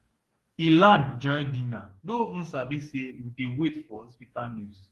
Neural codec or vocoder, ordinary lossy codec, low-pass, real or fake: codec, 44.1 kHz, 2.6 kbps, DAC; Opus, 24 kbps; 14.4 kHz; fake